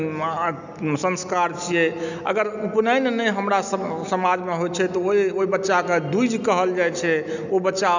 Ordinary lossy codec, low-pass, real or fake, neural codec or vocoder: none; 7.2 kHz; real; none